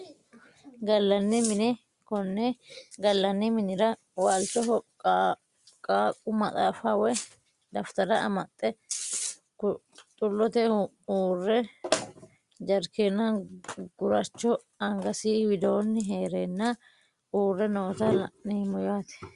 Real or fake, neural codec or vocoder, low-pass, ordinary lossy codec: real; none; 10.8 kHz; Opus, 64 kbps